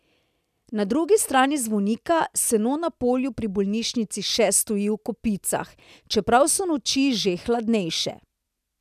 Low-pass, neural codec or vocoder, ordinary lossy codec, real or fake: 14.4 kHz; none; none; real